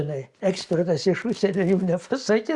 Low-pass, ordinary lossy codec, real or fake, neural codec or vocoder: 10.8 kHz; Opus, 64 kbps; real; none